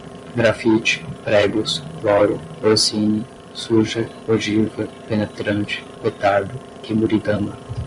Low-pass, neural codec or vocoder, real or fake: 10.8 kHz; vocoder, 24 kHz, 100 mel bands, Vocos; fake